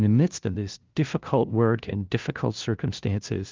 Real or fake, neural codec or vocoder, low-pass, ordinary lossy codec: fake; codec, 16 kHz, 0.5 kbps, FunCodec, trained on LibriTTS, 25 frames a second; 7.2 kHz; Opus, 24 kbps